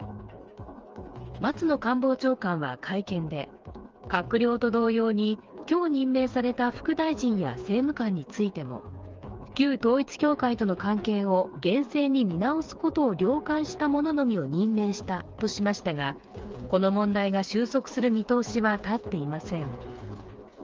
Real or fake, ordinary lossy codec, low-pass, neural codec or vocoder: fake; Opus, 24 kbps; 7.2 kHz; codec, 16 kHz, 4 kbps, FreqCodec, smaller model